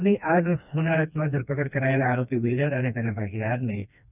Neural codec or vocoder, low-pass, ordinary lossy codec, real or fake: codec, 16 kHz, 2 kbps, FreqCodec, smaller model; 3.6 kHz; none; fake